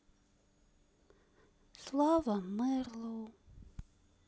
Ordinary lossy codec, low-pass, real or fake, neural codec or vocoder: none; none; real; none